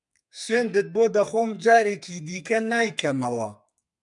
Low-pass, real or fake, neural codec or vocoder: 10.8 kHz; fake; codec, 32 kHz, 1.9 kbps, SNAC